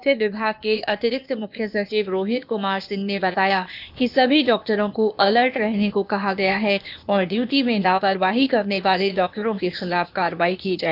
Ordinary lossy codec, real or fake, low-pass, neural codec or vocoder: none; fake; 5.4 kHz; codec, 16 kHz, 0.8 kbps, ZipCodec